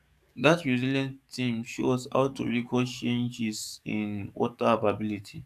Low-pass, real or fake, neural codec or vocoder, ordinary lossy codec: 14.4 kHz; fake; codec, 44.1 kHz, 7.8 kbps, DAC; AAC, 96 kbps